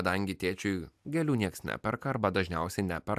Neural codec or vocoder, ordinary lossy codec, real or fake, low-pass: none; AAC, 96 kbps; real; 14.4 kHz